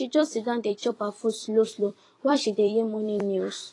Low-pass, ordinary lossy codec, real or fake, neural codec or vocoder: 10.8 kHz; AAC, 32 kbps; fake; vocoder, 44.1 kHz, 128 mel bands every 256 samples, BigVGAN v2